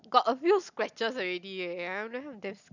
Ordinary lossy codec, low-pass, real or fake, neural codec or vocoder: none; 7.2 kHz; real; none